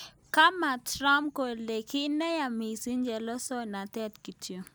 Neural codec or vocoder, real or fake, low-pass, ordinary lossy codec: none; real; none; none